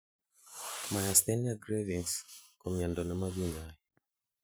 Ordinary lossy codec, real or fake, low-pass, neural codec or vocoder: none; fake; none; vocoder, 44.1 kHz, 128 mel bands every 512 samples, BigVGAN v2